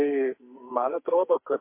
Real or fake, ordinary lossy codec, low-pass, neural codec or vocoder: fake; MP3, 24 kbps; 3.6 kHz; codec, 44.1 kHz, 2.6 kbps, SNAC